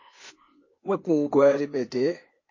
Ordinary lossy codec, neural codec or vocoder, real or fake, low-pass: MP3, 32 kbps; codec, 16 kHz, 0.8 kbps, ZipCodec; fake; 7.2 kHz